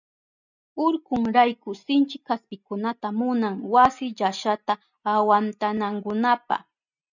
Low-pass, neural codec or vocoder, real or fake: 7.2 kHz; none; real